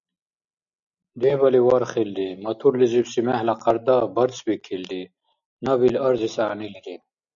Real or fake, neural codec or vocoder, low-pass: real; none; 7.2 kHz